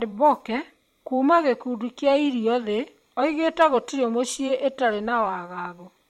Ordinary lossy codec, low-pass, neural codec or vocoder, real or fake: MP3, 64 kbps; 19.8 kHz; vocoder, 44.1 kHz, 128 mel bands every 512 samples, BigVGAN v2; fake